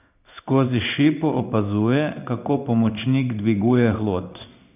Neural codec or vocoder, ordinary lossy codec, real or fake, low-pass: codec, 16 kHz in and 24 kHz out, 1 kbps, XY-Tokenizer; none; fake; 3.6 kHz